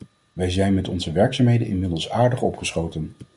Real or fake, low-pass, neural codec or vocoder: real; 10.8 kHz; none